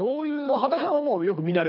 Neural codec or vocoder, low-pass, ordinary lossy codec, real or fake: codec, 24 kHz, 3 kbps, HILCodec; 5.4 kHz; none; fake